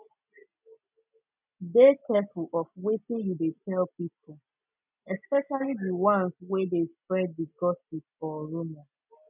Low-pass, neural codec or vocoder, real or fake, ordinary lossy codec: 3.6 kHz; none; real; none